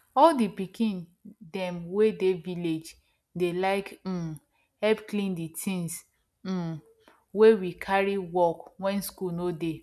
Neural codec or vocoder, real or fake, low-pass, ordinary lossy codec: none; real; none; none